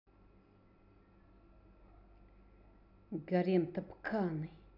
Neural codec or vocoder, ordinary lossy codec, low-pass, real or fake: none; none; 5.4 kHz; real